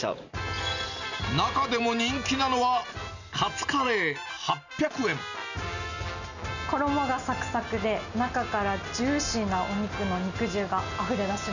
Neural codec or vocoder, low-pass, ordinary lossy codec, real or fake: none; 7.2 kHz; none; real